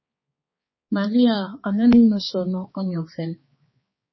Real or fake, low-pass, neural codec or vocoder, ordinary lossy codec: fake; 7.2 kHz; codec, 16 kHz, 4 kbps, X-Codec, HuBERT features, trained on balanced general audio; MP3, 24 kbps